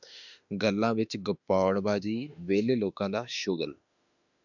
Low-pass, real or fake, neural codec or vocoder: 7.2 kHz; fake; autoencoder, 48 kHz, 32 numbers a frame, DAC-VAE, trained on Japanese speech